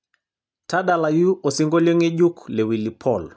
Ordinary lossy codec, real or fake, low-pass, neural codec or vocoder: none; real; none; none